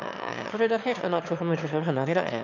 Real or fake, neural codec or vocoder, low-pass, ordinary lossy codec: fake; autoencoder, 22.05 kHz, a latent of 192 numbers a frame, VITS, trained on one speaker; 7.2 kHz; none